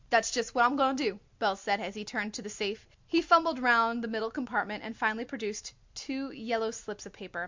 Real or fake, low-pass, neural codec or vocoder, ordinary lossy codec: real; 7.2 kHz; none; MP3, 64 kbps